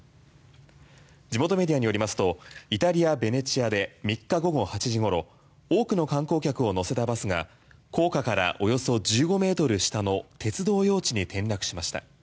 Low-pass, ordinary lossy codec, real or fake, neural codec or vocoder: none; none; real; none